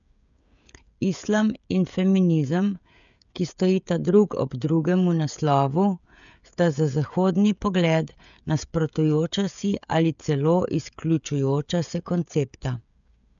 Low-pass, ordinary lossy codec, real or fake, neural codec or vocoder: 7.2 kHz; MP3, 96 kbps; fake; codec, 16 kHz, 16 kbps, FreqCodec, smaller model